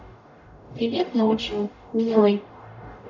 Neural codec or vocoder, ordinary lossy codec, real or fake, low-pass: codec, 44.1 kHz, 0.9 kbps, DAC; none; fake; 7.2 kHz